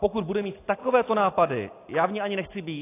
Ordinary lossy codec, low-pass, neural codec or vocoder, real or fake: AAC, 24 kbps; 3.6 kHz; none; real